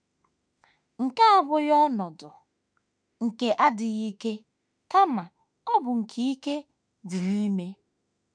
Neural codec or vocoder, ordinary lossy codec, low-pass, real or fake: autoencoder, 48 kHz, 32 numbers a frame, DAC-VAE, trained on Japanese speech; none; 9.9 kHz; fake